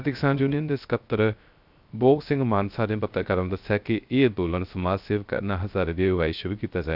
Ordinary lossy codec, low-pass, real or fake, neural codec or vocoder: none; 5.4 kHz; fake; codec, 16 kHz, 0.3 kbps, FocalCodec